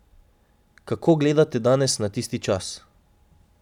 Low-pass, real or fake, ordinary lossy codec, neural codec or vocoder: 19.8 kHz; real; none; none